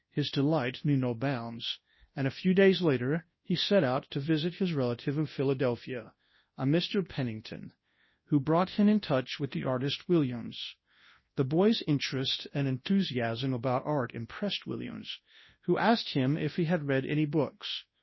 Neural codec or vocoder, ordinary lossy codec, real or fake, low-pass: codec, 24 kHz, 0.9 kbps, WavTokenizer, large speech release; MP3, 24 kbps; fake; 7.2 kHz